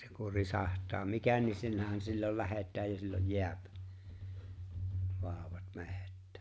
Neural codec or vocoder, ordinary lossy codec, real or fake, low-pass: none; none; real; none